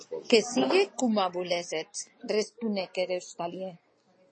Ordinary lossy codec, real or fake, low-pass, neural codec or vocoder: MP3, 32 kbps; real; 9.9 kHz; none